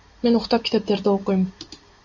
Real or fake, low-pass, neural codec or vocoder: real; 7.2 kHz; none